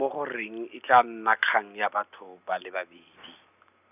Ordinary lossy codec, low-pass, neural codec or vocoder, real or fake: none; 3.6 kHz; none; real